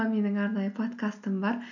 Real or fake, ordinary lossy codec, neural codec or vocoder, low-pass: real; none; none; 7.2 kHz